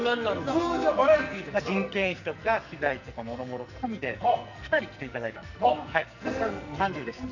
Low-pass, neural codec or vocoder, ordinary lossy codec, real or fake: 7.2 kHz; codec, 44.1 kHz, 2.6 kbps, SNAC; none; fake